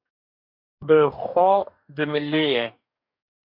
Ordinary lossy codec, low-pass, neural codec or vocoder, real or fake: MP3, 48 kbps; 5.4 kHz; codec, 44.1 kHz, 2.6 kbps, DAC; fake